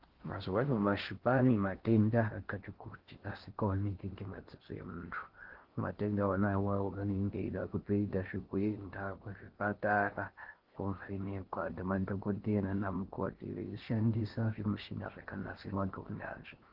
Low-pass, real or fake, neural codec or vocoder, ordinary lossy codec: 5.4 kHz; fake; codec, 16 kHz in and 24 kHz out, 0.6 kbps, FocalCodec, streaming, 4096 codes; Opus, 16 kbps